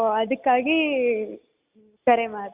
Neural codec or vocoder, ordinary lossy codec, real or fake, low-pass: none; Opus, 64 kbps; real; 3.6 kHz